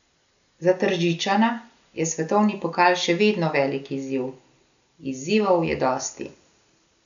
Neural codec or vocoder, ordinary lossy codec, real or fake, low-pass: none; none; real; 7.2 kHz